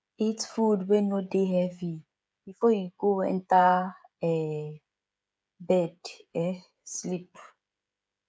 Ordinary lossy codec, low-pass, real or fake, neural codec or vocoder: none; none; fake; codec, 16 kHz, 16 kbps, FreqCodec, smaller model